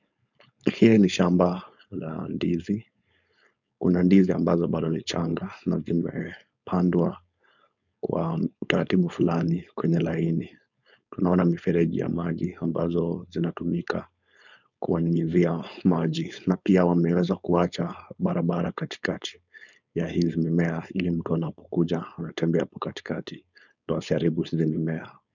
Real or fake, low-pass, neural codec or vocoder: fake; 7.2 kHz; codec, 16 kHz, 4.8 kbps, FACodec